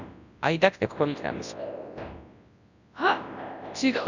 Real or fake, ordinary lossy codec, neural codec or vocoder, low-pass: fake; none; codec, 24 kHz, 0.9 kbps, WavTokenizer, large speech release; 7.2 kHz